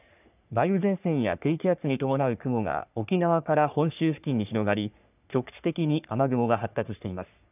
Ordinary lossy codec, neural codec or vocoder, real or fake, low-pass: none; codec, 44.1 kHz, 3.4 kbps, Pupu-Codec; fake; 3.6 kHz